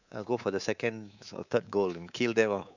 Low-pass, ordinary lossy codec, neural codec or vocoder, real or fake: 7.2 kHz; none; codec, 24 kHz, 3.1 kbps, DualCodec; fake